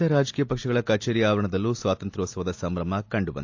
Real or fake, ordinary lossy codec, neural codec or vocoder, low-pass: real; AAC, 48 kbps; none; 7.2 kHz